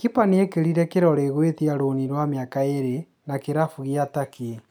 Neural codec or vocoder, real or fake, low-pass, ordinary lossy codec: none; real; none; none